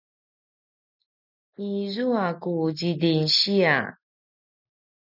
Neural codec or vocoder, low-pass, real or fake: none; 5.4 kHz; real